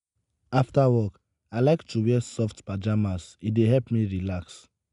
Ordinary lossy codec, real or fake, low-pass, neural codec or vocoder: none; real; 10.8 kHz; none